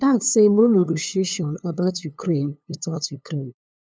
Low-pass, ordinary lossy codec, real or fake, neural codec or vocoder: none; none; fake; codec, 16 kHz, 2 kbps, FunCodec, trained on LibriTTS, 25 frames a second